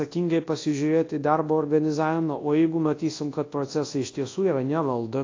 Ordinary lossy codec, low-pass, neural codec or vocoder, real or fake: AAC, 32 kbps; 7.2 kHz; codec, 24 kHz, 0.9 kbps, WavTokenizer, large speech release; fake